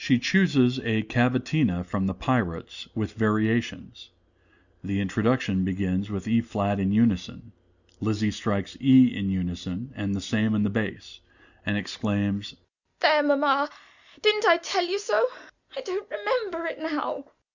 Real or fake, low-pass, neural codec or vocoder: real; 7.2 kHz; none